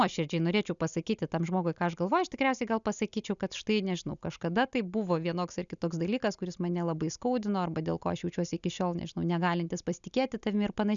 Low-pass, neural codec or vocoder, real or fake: 7.2 kHz; none; real